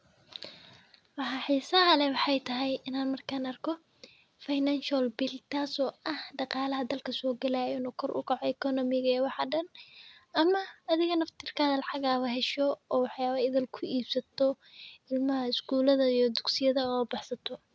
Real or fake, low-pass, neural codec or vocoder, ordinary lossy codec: real; none; none; none